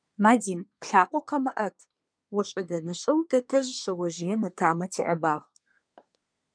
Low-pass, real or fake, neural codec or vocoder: 9.9 kHz; fake; codec, 24 kHz, 1 kbps, SNAC